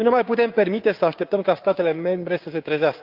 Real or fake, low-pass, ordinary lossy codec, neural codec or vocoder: fake; 5.4 kHz; Opus, 24 kbps; codec, 16 kHz, 6 kbps, DAC